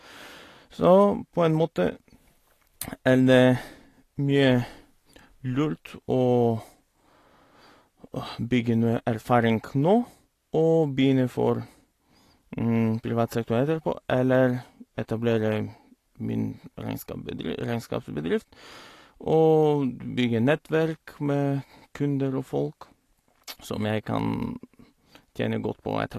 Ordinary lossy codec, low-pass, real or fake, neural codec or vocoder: AAC, 48 kbps; 14.4 kHz; real; none